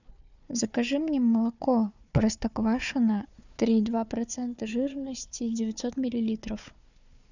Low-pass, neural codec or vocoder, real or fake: 7.2 kHz; codec, 16 kHz, 4 kbps, FunCodec, trained on Chinese and English, 50 frames a second; fake